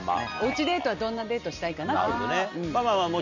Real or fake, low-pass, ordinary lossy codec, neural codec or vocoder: real; 7.2 kHz; none; none